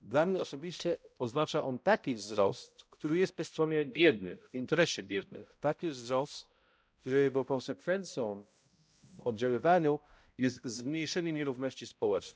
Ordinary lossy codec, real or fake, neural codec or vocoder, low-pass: none; fake; codec, 16 kHz, 0.5 kbps, X-Codec, HuBERT features, trained on balanced general audio; none